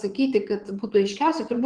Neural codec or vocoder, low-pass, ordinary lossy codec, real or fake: vocoder, 22.05 kHz, 80 mel bands, WaveNeXt; 9.9 kHz; Opus, 16 kbps; fake